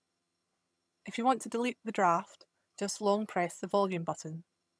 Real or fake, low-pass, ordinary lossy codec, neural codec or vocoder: fake; none; none; vocoder, 22.05 kHz, 80 mel bands, HiFi-GAN